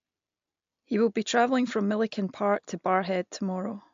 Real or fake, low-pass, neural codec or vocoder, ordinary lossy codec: real; 7.2 kHz; none; AAC, 64 kbps